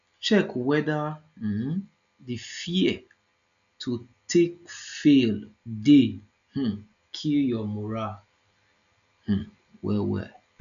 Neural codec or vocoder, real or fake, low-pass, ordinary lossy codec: none; real; 7.2 kHz; none